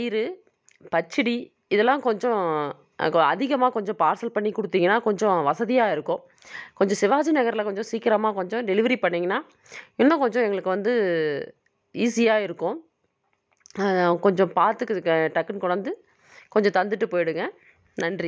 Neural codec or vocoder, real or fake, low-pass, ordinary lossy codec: none; real; none; none